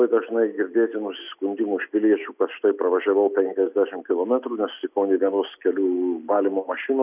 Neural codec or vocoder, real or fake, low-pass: none; real; 3.6 kHz